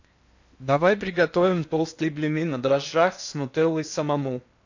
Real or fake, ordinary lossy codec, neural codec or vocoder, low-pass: fake; AAC, 48 kbps; codec, 16 kHz in and 24 kHz out, 0.8 kbps, FocalCodec, streaming, 65536 codes; 7.2 kHz